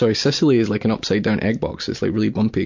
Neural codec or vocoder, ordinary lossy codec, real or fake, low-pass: none; MP3, 48 kbps; real; 7.2 kHz